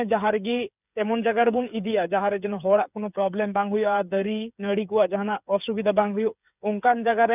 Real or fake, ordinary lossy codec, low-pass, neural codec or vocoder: fake; none; 3.6 kHz; codec, 16 kHz, 8 kbps, FreqCodec, smaller model